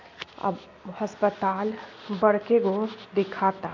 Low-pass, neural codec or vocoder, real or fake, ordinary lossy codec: 7.2 kHz; none; real; MP3, 48 kbps